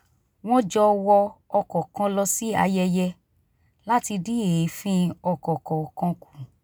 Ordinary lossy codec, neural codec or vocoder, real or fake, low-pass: none; none; real; none